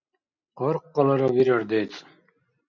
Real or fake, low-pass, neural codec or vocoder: real; 7.2 kHz; none